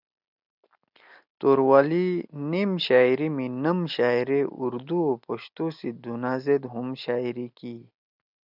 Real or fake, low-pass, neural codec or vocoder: real; 5.4 kHz; none